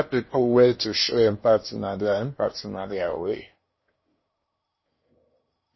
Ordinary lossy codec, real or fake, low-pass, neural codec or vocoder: MP3, 24 kbps; fake; 7.2 kHz; codec, 16 kHz in and 24 kHz out, 0.8 kbps, FocalCodec, streaming, 65536 codes